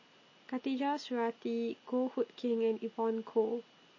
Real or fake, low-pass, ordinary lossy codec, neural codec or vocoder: real; 7.2 kHz; MP3, 32 kbps; none